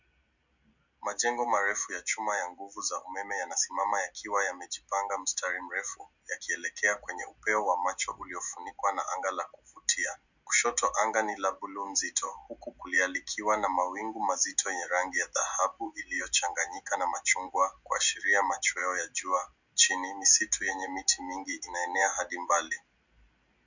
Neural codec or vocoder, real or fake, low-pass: none; real; 7.2 kHz